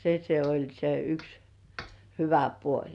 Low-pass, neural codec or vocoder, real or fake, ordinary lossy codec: 10.8 kHz; none; real; none